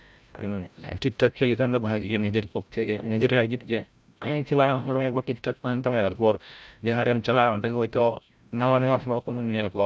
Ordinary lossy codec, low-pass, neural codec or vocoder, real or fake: none; none; codec, 16 kHz, 0.5 kbps, FreqCodec, larger model; fake